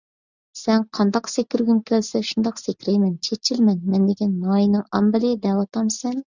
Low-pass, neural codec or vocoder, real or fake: 7.2 kHz; none; real